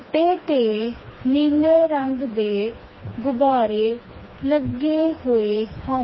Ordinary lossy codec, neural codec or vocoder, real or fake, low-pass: MP3, 24 kbps; codec, 16 kHz, 2 kbps, FreqCodec, smaller model; fake; 7.2 kHz